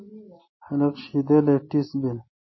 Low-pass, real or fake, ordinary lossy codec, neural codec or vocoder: 7.2 kHz; real; MP3, 24 kbps; none